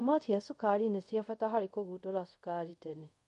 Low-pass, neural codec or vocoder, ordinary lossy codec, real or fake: 10.8 kHz; codec, 24 kHz, 0.5 kbps, DualCodec; MP3, 48 kbps; fake